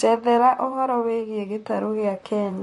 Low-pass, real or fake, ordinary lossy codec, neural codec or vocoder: 14.4 kHz; fake; MP3, 48 kbps; vocoder, 44.1 kHz, 128 mel bands, Pupu-Vocoder